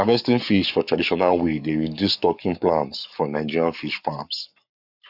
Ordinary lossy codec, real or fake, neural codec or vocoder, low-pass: none; fake; codec, 44.1 kHz, 7.8 kbps, Pupu-Codec; 5.4 kHz